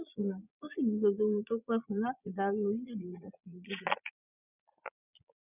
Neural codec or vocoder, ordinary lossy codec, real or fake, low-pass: vocoder, 22.05 kHz, 80 mel bands, Vocos; none; fake; 3.6 kHz